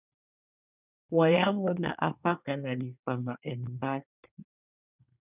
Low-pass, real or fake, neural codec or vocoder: 3.6 kHz; fake; codec, 24 kHz, 1 kbps, SNAC